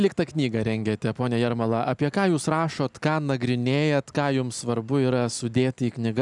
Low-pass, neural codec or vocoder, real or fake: 10.8 kHz; none; real